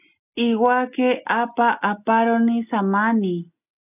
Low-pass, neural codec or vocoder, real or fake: 3.6 kHz; none; real